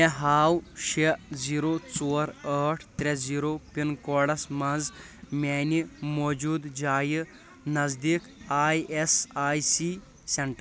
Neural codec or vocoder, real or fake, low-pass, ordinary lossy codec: none; real; none; none